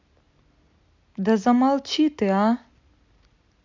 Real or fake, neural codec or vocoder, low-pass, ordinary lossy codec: real; none; 7.2 kHz; MP3, 64 kbps